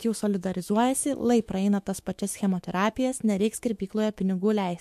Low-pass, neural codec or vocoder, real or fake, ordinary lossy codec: 14.4 kHz; autoencoder, 48 kHz, 128 numbers a frame, DAC-VAE, trained on Japanese speech; fake; MP3, 64 kbps